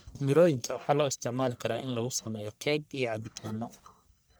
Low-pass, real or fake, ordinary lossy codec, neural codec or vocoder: none; fake; none; codec, 44.1 kHz, 1.7 kbps, Pupu-Codec